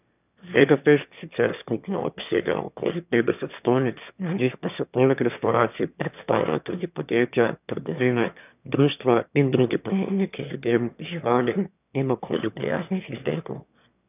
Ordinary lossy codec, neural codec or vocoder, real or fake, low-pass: none; autoencoder, 22.05 kHz, a latent of 192 numbers a frame, VITS, trained on one speaker; fake; 3.6 kHz